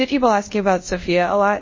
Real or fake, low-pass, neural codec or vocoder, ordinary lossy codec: fake; 7.2 kHz; codec, 24 kHz, 0.5 kbps, DualCodec; MP3, 32 kbps